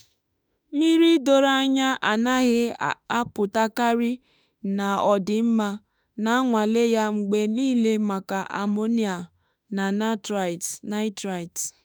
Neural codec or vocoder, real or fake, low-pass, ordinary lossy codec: autoencoder, 48 kHz, 32 numbers a frame, DAC-VAE, trained on Japanese speech; fake; none; none